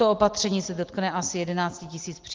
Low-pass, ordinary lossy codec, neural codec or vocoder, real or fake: 7.2 kHz; Opus, 16 kbps; none; real